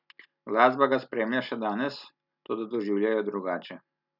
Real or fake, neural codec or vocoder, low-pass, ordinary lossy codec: real; none; 5.4 kHz; none